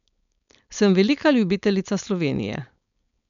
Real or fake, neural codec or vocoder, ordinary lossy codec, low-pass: fake; codec, 16 kHz, 4.8 kbps, FACodec; none; 7.2 kHz